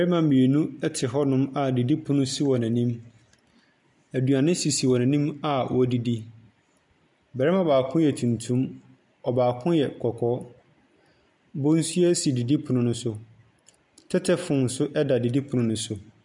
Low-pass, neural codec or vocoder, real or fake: 10.8 kHz; none; real